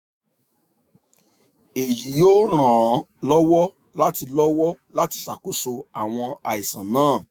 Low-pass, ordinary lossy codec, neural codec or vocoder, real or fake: none; none; autoencoder, 48 kHz, 128 numbers a frame, DAC-VAE, trained on Japanese speech; fake